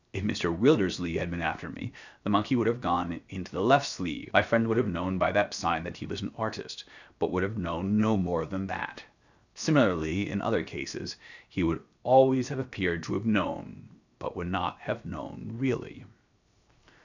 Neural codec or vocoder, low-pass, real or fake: codec, 16 kHz, 0.7 kbps, FocalCodec; 7.2 kHz; fake